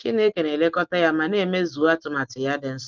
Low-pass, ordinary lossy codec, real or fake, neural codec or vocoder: 7.2 kHz; Opus, 24 kbps; real; none